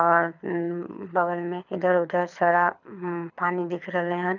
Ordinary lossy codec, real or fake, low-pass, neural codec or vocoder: none; fake; 7.2 kHz; codec, 24 kHz, 6 kbps, HILCodec